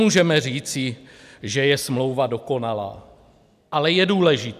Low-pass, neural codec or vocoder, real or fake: 14.4 kHz; none; real